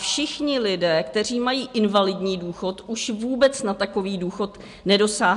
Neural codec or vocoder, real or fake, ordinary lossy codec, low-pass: none; real; MP3, 64 kbps; 10.8 kHz